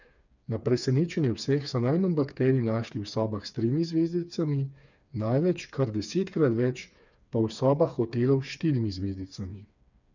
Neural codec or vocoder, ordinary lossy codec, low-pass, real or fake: codec, 16 kHz, 4 kbps, FreqCodec, smaller model; none; 7.2 kHz; fake